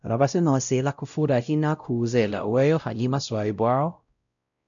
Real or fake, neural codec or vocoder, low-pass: fake; codec, 16 kHz, 0.5 kbps, X-Codec, WavLM features, trained on Multilingual LibriSpeech; 7.2 kHz